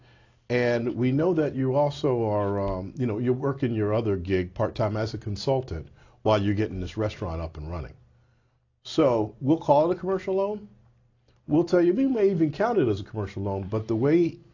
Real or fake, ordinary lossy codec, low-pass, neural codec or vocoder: real; AAC, 48 kbps; 7.2 kHz; none